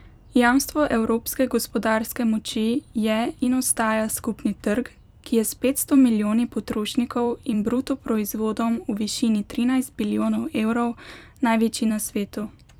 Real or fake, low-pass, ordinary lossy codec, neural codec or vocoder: fake; 19.8 kHz; none; vocoder, 44.1 kHz, 128 mel bands every 256 samples, BigVGAN v2